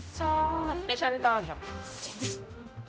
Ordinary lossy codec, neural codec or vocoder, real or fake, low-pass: none; codec, 16 kHz, 0.5 kbps, X-Codec, HuBERT features, trained on general audio; fake; none